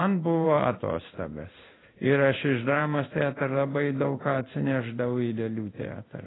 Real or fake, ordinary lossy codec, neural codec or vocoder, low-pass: fake; AAC, 16 kbps; codec, 16 kHz in and 24 kHz out, 1 kbps, XY-Tokenizer; 7.2 kHz